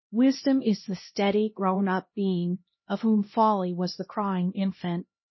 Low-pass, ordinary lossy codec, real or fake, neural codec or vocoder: 7.2 kHz; MP3, 24 kbps; fake; codec, 16 kHz, 1 kbps, X-Codec, HuBERT features, trained on LibriSpeech